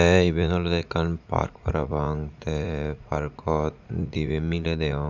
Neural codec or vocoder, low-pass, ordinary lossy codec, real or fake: vocoder, 44.1 kHz, 80 mel bands, Vocos; 7.2 kHz; none; fake